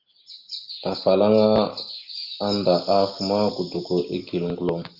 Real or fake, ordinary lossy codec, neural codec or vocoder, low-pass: real; Opus, 32 kbps; none; 5.4 kHz